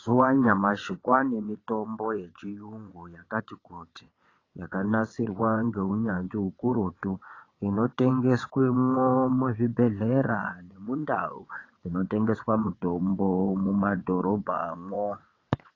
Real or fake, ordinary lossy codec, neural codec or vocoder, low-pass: fake; AAC, 32 kbps; vocoder, 22.05 kHz, 80 mel bands, WaveNeXt; 7.2 kHz